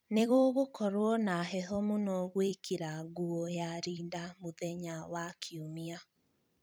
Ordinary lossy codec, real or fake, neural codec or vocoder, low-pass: none; fake; vocoder, 44.1 kHz, 128 mel bands every 256 samples, BigVGAN v2; none